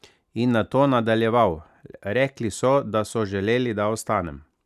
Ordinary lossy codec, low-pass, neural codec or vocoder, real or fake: none; 14.4 kHz; none; real